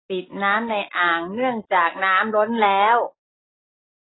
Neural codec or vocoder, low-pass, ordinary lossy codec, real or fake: none; 7.2 kHz; AAC, 16 kbps; real